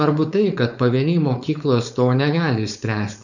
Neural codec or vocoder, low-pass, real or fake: codec, 16 kHz, 4.8 kbps, FACodec; 7.2 kHz; fake